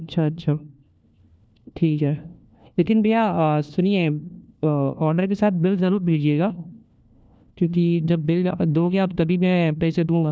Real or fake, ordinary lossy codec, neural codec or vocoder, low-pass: fake; none; codec, 16 kHz, 1 kbps, FunCodec, trained on LibriTTS, 50 frames a second; none